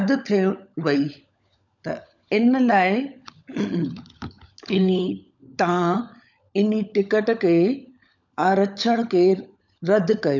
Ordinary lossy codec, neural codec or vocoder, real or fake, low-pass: none; codec, 16 kHz, 16 kbps, FunCodec, trained on LibriTTS, 50 frames a second; fake; 7.2 kHz